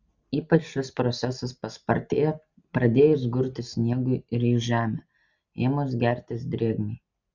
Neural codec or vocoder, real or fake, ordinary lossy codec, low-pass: none; real; Opus, 64 kbps; 7.2 kHz